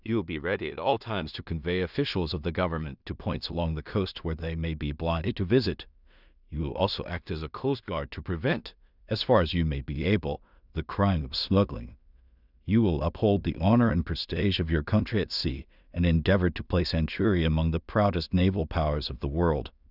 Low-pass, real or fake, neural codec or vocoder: 5.4 kHz; fake; codec, 16 kHz in and 24 kHz out, 0.4 kbps, LongCat-Audio-Codec, two codebook decoder